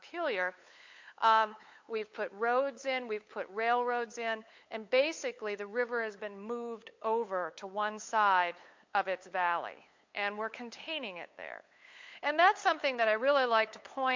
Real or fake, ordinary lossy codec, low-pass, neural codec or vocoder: fake; MP3, 48 kbps; 7.2 kHz; codec, 16 kHz, 8 kbps, FunCodec, trained on LibriTTS, 25 frames a second